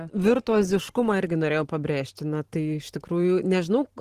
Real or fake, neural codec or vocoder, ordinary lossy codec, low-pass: fake; vocoder, 44.1 kHz, 128 mel bands every 512 samples, BigVGAN v2; Opus, 16 kbps; 14.4 kHz